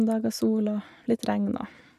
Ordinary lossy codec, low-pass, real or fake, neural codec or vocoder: none; 14.4 kHz; real; none